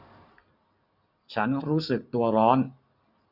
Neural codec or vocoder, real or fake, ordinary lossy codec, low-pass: none; real; none; 5.4 kHz